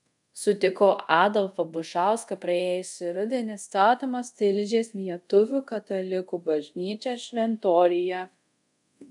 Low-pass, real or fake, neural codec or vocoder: 10.8 kHz; fake; codec, 24 kHz, 0.5 kbps, DualCodec